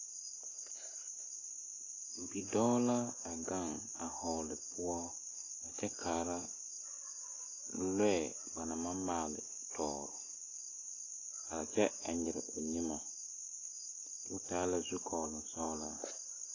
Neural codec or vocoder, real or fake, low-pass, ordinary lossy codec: none; real; 7.2 kHz; AAC, 32 kbps